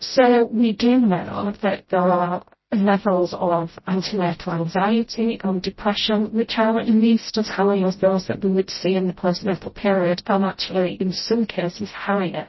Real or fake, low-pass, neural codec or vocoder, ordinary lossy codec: fake; 7.2 kHz; codec, 16 kHz, 0.5 kbps, FreqCodec, smaller model; MP3, 24 kbps